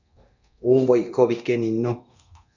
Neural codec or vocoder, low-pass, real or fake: codec, 24 kHz, 0.9 kbps, DualCodec; 7.2 kHz; fake